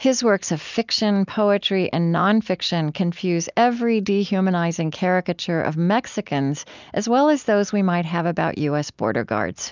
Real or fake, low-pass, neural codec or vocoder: real; 7.2 kHz; none